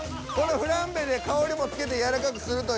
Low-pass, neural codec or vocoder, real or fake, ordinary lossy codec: none; none; real; none